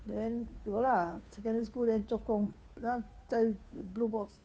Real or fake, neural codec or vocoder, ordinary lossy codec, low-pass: fake; codec, 16 kHz, 2 kbps, FunCodec, trained on Chinese and English, 25 frames a second; none; none